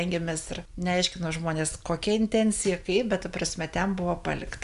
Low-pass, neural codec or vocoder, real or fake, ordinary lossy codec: 10.8 kHz; none; real; Opus, 64 kbps